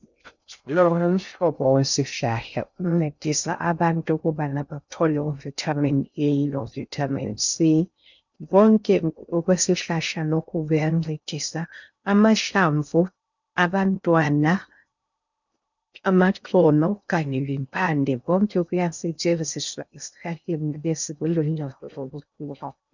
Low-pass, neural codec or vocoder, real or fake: 7.2 kHz; codec, 16 kHz in and 24 kHz out, 0.6 kbps, FocalCodec, streaming, 2048 codes; fake